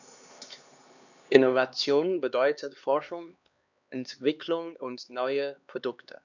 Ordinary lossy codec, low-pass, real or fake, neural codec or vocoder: none; 7.2 kHz; fake; codec, 16 kHz, 2 kbps, X-Codec, HuBERT features, trained on LibriSpeech